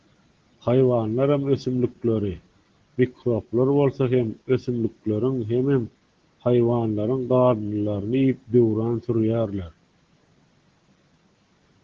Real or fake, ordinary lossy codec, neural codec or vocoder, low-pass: real; Opus, 16 kbps; none; 7.2 kHz